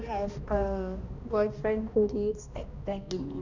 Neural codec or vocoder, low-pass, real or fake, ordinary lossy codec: codec, 16 kHz, 1 kbps, X-Codec, HuBERT features, trained on balanced general audio; 7.2 kHz; fake; none